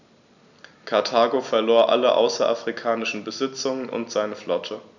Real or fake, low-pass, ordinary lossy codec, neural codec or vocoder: real; 7.2 kHz; none; none